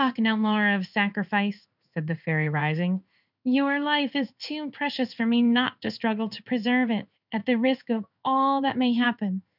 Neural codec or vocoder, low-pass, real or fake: codec, 16 kHz in and 24 kHz out, 1 kbps, XY-Tokenizer; 5.4 kHz; fake